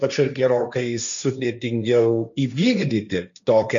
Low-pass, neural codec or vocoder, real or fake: 7.2 kHz; codec, 16 kHz, 1.1 kbps, Voila-Tokenizer; fake